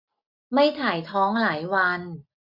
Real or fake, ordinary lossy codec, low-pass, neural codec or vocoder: real; none; 5.4 kHz; none